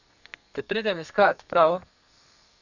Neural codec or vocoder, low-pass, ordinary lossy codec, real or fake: codec, 32 kHz, 1.9 kbps, SNAC; 7.2 kHz; none; fake